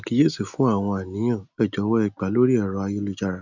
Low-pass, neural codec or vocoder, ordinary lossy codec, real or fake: 7.2 kHz; none; none; real